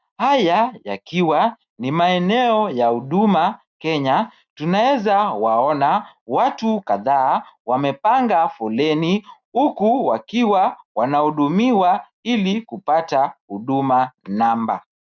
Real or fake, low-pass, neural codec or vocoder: real; 7.2 kHz; none